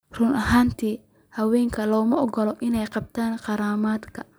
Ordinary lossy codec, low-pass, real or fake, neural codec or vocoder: none; none; real; none